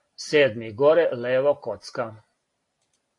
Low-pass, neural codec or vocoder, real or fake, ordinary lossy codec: 10.8 kHz; none; real; AAC, 48 kbps